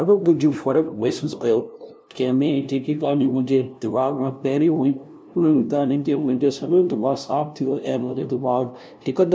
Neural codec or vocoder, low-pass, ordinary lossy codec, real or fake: codec, 16 kHz, 0.5 kbps, FunCodec, trained on LibriTTS, 25 frames a second; none; none; fake